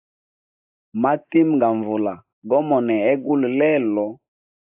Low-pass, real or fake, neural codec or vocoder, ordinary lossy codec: 3.6 kHz; real; none; AAC, 32 kbps